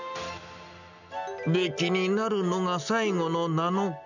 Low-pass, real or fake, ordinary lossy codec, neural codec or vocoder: 7.2 kHz; real; none; none